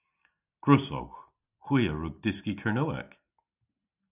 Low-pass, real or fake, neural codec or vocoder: 3.6 kHz; real; none